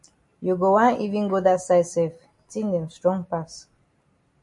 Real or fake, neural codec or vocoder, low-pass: real; none; 10.8 kHz